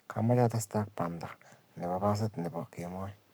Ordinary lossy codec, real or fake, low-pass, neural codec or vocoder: none; fake; none; codec, 44.1 kHz, 7.8 kbps, Pupu-Codec